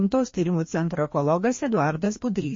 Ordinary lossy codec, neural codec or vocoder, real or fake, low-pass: MP3, 32 kbps; codec, 16 kHz, 1 kbps, FreqCodec, larger model; fake; 7.2 kHz